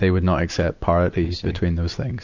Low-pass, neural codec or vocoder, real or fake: 7.2 kHz; none; real